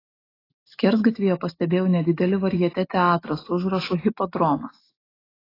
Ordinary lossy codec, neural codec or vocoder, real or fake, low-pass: AAC, 24 kbps; none; real; 5.4 kHz